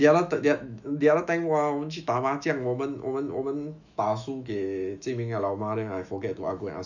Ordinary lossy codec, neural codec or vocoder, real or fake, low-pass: none; autoencoder, 48 kHz, 128 numbers a frame, DAC-VAE, trained on Japanese speech; fake; 7.2 kHz